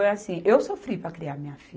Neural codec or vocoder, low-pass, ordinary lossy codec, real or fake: none; none; none; real